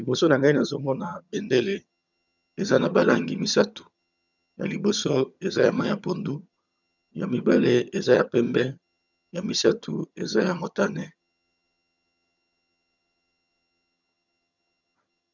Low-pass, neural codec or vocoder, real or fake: 7.2 kHz; vocoder, 22.05 kHz, 80 mel bands, HiFi-GAN; fake